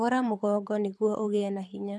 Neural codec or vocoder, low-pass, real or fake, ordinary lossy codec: codec, 24 kHz, 6 kbps, HILCodec; none; fake; none